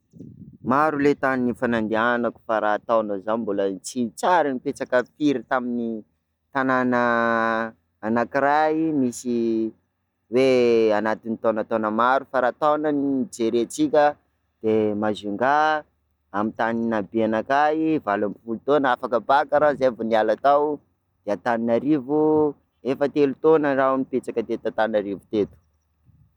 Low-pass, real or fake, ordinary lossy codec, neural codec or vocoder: 19.8 kHz; real; none; none